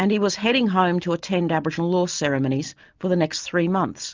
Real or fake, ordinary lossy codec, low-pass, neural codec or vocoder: real; Opus, 24 kbps; 7.2 kHz; none